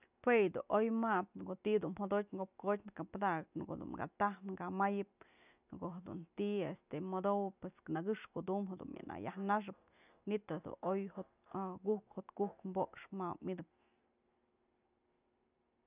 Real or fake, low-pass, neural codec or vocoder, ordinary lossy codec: real; 3.6 kHz; none; none